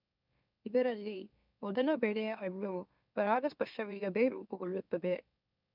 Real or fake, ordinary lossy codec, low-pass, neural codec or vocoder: fake; none; 5.4 kHz; autoencoder, 44.1 kHz, a latent of 192 numbers a frame, MeloTTS